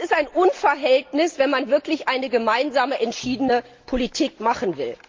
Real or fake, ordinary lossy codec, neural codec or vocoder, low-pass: real; Opus, 32 kbps; none; 7.2 kHz